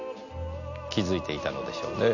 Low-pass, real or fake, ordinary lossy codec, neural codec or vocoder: 7.2 kHz; real; none; none